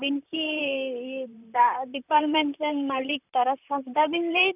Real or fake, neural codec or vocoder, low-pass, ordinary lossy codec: fake; vocoder, 44.1 kHz, 128 mel bands, Pupu-Vocoder; 3.6 kHz; none